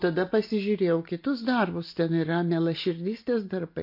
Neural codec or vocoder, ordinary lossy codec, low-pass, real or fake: none; MP3, 32 kbps; 5.4 kHz; real